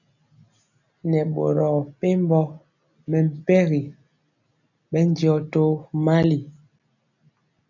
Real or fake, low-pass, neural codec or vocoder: real; 7.2 kHz; none